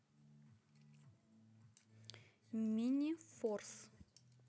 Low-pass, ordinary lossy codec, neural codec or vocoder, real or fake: none; none; none; real